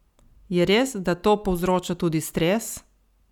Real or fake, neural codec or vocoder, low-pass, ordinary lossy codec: real; none; 19.8 kHz; none